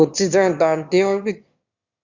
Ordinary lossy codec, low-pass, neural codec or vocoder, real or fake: Opus, 64 kbps; 7.2 kHz; autoencoder, 22.05 kHz, a latent of 192 numbers a frame, VITS, trained on one speaker; fake